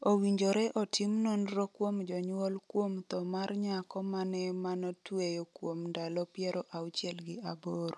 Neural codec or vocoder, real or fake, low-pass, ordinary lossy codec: none; real; none; none